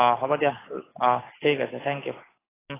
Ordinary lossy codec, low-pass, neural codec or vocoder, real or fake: AAC, 16 kbps; 3.6 kHz; none; real